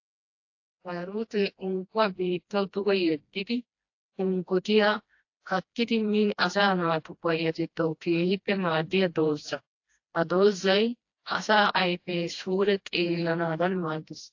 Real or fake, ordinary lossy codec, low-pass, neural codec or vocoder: fake; AAC, 48 kbps; 7.2 kHz; codec, 16 kHz, 1 kbps, FreqCodec, smaller model